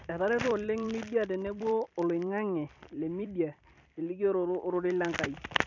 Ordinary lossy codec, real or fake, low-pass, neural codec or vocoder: none; fake; 7.2 kHz; vocoder, 44.1 kHz, 128 mel bands every 256 samples, BigVGAN v2